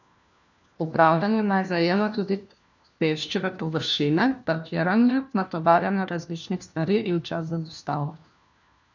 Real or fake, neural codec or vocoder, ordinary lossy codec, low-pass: fake; codec, 16 kHz, 1 kbps, FunCodec, trained on LibriTTS, 50 frames a second; none; 7.2 kHz